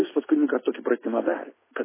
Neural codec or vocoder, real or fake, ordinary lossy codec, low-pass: none; real; MP3, 16 kbps; 3.6 kHz